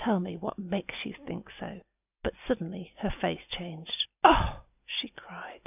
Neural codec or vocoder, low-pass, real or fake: none; 3.6 kHz; real